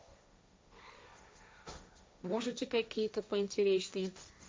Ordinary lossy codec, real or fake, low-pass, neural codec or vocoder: none; fake; none; codec, 16 kHz, 1.1 kbps, Voila-Tokenizer